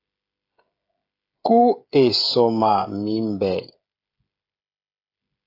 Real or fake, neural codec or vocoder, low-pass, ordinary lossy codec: fake; codec, 16 kHz, 16 kbps, FreqCodec, smaller model; 5.4 kHz; AAC, 32 kbps